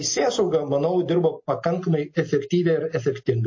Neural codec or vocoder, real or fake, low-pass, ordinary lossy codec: none; real; 7.2 kHz; MP3, 32 kbps